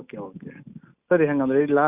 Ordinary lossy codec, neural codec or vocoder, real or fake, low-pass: Opus, 64 kbps; none; real; 3.6 kHz